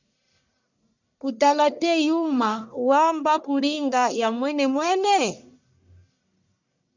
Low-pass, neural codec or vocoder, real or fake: 7.2 kHz; codec, 44.1 kHz, 1.7 kbps, Pupu-Codec; fake